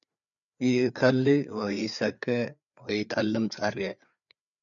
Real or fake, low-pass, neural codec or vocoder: fake; 7.2 kHz; codec, 16 kHz, 4 kbps, FreqCodec, larger model